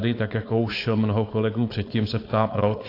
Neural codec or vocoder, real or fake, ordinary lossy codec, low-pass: codec, 16 kHz, 4.8 kbps, FACodec; fake; AAC, 32 kbps; 5.4 kHz